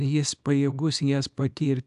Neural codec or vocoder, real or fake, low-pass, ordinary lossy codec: codec, 24 kHz, 0.9 kbps, WavTokenizer, small release; fake; 10.8 kHz; AAC, 96 kbps